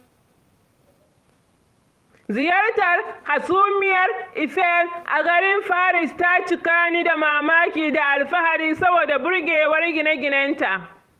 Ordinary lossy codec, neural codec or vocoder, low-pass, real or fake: Opus, 32 kbps; vocoder, 44.1 kHz, 128 mel bands every 512 samples, BigVGAN v2; 14.4 kHz; fake